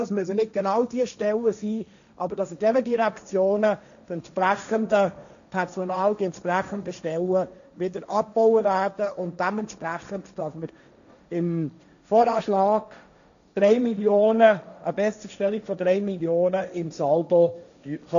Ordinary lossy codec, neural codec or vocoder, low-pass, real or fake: none; codec, 16 kHz, 1.1 kbps, Voila-Tokenizer; 7.2 kHz; fake